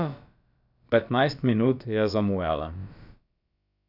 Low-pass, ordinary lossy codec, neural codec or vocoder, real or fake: 5.4 kHz; none; codec, 16 kHz, about 1 kbps, DyCAST, with the encoder's durations; fake